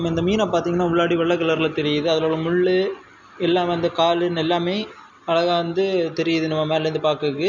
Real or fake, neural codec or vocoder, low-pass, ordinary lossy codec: real; none; 7.2 kHz; none